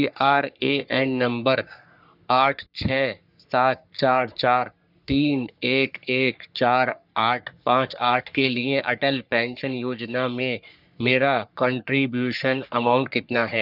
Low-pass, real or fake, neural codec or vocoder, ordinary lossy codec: 5.4 kHz; fake; codec, 44.1 kHz, 3.4 kbps, Pupu-Codec; none